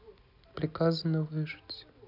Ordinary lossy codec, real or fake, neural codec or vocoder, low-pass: none; real; none; 5.4 kHz